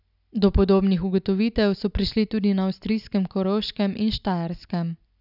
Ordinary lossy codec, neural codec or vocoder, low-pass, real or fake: none; none; 5.4 kHz; real